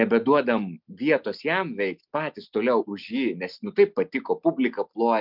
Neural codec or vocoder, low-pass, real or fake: none; 5.4 kHz; real